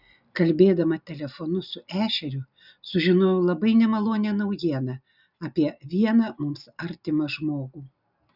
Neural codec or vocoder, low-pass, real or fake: none; 5.4 kHz; real